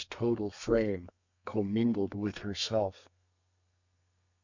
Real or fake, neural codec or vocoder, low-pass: fake; codec, 32 kHz, 1.9 kbps, SNAC; 7.2 kHz